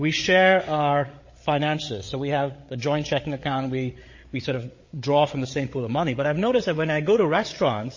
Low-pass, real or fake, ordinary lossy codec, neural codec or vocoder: 7.2 kHz; fake; MP3, 32 kbps; codec, 16 kHz, 8 kbps, FreqCodec, larger model